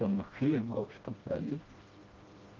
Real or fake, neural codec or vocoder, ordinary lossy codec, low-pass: fake; codec, 16 kHz, 1 kbps, FreqCodec, smaller model; Opus, 32 kbps; 7.2 kHz